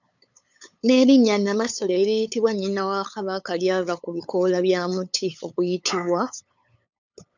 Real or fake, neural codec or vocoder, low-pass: fake; codec, 16 kHz, 8 kbps, FunCodec, trained on LibriTTS, 25 frames a second; 7.2 kHz